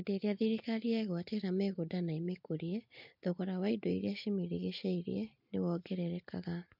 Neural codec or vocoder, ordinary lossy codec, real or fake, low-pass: none; MP3, 48 kbps; real; 5.4 kHz